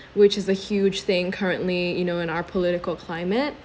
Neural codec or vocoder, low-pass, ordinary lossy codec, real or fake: none; none; none; real